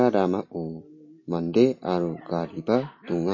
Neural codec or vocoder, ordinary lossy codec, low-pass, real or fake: none; MP3, 32 kbps; 7.2 kHz; real